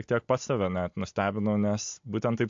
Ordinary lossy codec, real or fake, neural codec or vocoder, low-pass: MP3, 48 kbps; real; none; 7.2 kHz